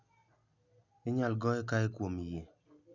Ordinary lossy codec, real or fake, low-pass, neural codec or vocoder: none; real; 7.2 kHz; none